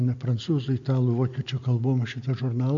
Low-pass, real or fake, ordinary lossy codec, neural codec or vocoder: 7.2 kHz; real; MP3, 48 kbps; none